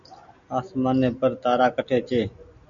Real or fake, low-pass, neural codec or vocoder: real; 7.2 kHz; none